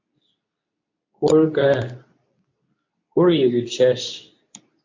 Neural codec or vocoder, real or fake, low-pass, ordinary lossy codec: codec, 24 kHz, 0.9 kbps, WavTokenizer, medium speech release version 2; fake; 7.2 kHz; MP3, 48 kbps